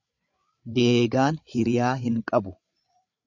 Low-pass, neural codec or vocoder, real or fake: 7.2 kHz; codec, 16 kHz, 8 kbps, FreqCodec, larger model; fake